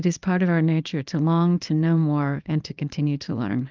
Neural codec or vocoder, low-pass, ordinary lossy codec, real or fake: codec, 24 kHz, 0.9 kbps, WavTokenizer, small release; 7.2 kHz; Opus, 32 kbps; fake